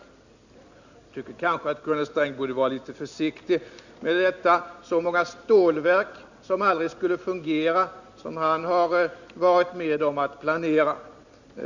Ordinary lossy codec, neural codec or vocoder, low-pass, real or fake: none; none; 7.2 kHz; real